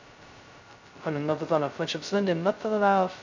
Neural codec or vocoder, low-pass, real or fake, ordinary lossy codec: codec, 16 kHz, 0.2 kbps, FocalCodec; 7.2 kHz; fake; MP3, 64 kbps